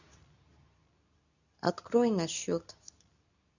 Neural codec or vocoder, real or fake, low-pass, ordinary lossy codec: codec, 24 kHz, 0.9 kbps, WavTokenizer, medium speech release version 2; fake; 7.2 kHz; none